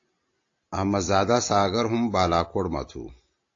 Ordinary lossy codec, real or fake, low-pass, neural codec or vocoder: AAC, 48 kbps; real; 7.2 kHz; none